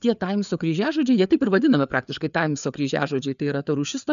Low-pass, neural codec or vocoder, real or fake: 7.2 kHz; codec, 16 kHz, 4 kbps, FreqCodec, larger model; fake